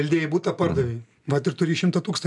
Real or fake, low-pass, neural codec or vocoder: real; 10.8 kHz; none